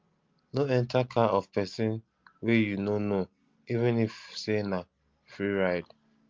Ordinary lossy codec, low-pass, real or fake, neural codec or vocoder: Opus, 32 kbps; 7.2 kHz; real; none